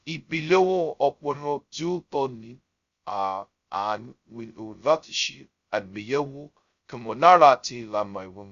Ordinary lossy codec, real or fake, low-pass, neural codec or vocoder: Opus, 64 kbps; fake; 7.2 kHz; codec, 16 kHz, 0.2 kbps, FocalCodec